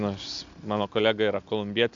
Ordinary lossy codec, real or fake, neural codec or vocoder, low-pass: MP3, 64 kbps; real; none; 7.2 kHz